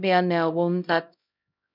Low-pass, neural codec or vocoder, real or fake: 5.4 kHz; codec, 16 kHz, 0.5 kbps, X-Codec, HuBERT features, trained on LibriSpeech; fake